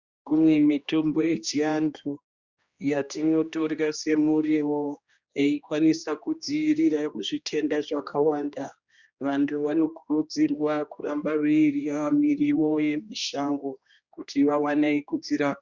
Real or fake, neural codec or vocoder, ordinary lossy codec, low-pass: fake; codec, 16 kHz, 1 kbps, X-Codec, HuBERT features, trained on general audio; Opus, 64 kbps; 7.2 kHz